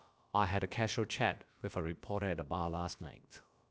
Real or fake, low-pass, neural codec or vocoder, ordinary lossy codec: fake; none; codec, 16 kHz, about 1 kbps, DyCAST, with the encoder's durations; none